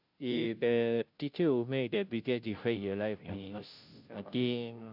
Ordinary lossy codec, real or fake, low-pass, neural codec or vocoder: none; fake; 5.4 kHz; codec, 16 kHz, 0.5 kbps, FunCodec, trained on Chinese and English, 25 frames a second